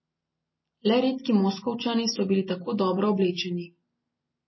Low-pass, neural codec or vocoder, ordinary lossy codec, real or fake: 7.2 kHz; none; MP3, 24 kbps; real